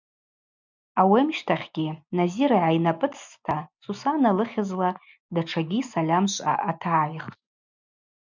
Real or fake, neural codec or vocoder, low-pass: real; none; 7.2 kHz